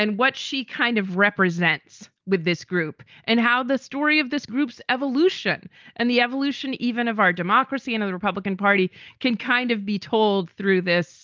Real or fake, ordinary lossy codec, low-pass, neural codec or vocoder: real; Opus, 24 kbps; 7.2 kHz; none